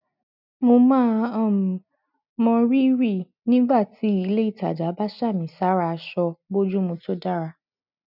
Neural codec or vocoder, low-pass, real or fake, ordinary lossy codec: none; 5.4 kHz; real; none